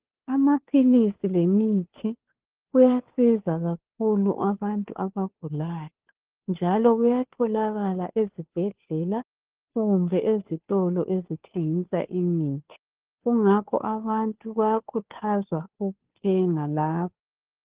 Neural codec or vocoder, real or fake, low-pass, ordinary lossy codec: codec, 16 kHz, 2 kbps, FunCodec, trained on Chinese and English, 25 frames a second; fake; 3.6 kHz; Opus, 16 kbps